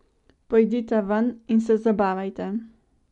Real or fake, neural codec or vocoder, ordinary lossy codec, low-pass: real; none; MP3, 96 kbps; 10.8 kHz